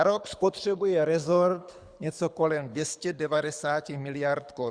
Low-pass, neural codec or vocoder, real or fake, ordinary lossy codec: 9.9 kHz; codec, 24 kHz, 6 kbps, HILCodec; fake; Opus, 64 kbps